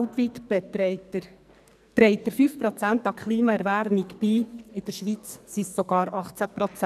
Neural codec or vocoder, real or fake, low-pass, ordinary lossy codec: codec, 44.1 kHz, 2.6 kbps, SNAC; fake; 14.4 kHz; none